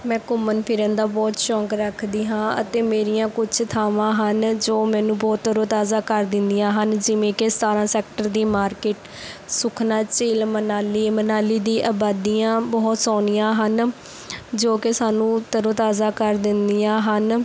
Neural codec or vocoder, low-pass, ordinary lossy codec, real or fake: none; none; none; real